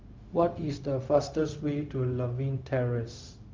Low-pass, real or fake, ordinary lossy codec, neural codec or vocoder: 7.2 kHz; fake; Opus, 32 kbps; codec, 16 kHz, 0.4 kbps, LongCat-Audio-Codec